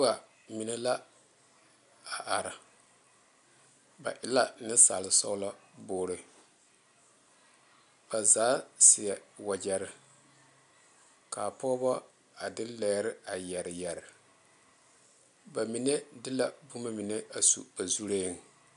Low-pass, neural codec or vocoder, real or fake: 10.8 kHz; none; real